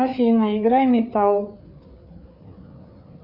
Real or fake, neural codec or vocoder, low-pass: fake; codec, 16 kHz, 4 kbps, FreqCodec, larger model; 5.4 kHz